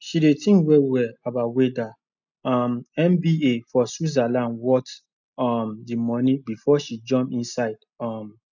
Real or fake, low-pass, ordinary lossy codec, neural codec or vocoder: real; 7.2 kHz; none; none